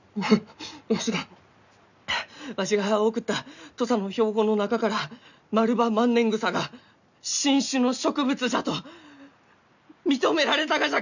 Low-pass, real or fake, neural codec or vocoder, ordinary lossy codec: 7.2 kHz; real; none; none